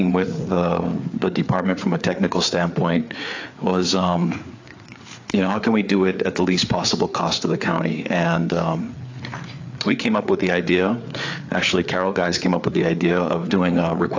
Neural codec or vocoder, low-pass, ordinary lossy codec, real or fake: codec, 16 kHz, 4 kbps, FreqCodec, larger model; 7.2 kHz; AAC, 48 kbps; fake